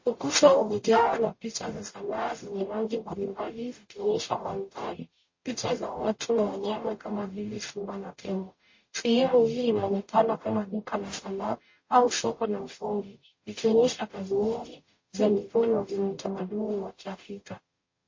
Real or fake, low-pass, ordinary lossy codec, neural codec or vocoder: fake; 7.2 kHz; MP3, 32 kbps; codec, 44.1 kHz, 0.9 kbps, DAC